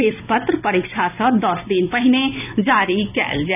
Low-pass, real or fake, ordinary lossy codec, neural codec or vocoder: 3.6 kHz; real; none; none